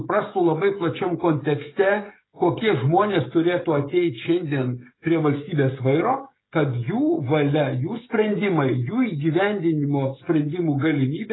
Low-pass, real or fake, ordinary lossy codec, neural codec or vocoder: 7.2 kHz; fake; AAC, 16 kbps; codec, 16 kHz, 16 kbps, FreqCodec, smaller model